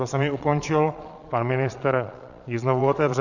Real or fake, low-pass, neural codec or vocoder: fake; 7.2 kHz; vocoder, 22.05 kHz, 80 mel bands, Vocos